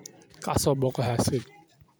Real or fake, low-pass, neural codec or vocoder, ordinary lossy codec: fake; none; vocoder, 44.1 kHz, 128 mel bands every 256 samples, BigVGAN v2; none